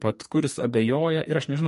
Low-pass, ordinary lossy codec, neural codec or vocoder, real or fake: 14.4 kHz; MP3, 48 kbps; codec, 44.1 kHz, 2.6 kbps, SNAC; fake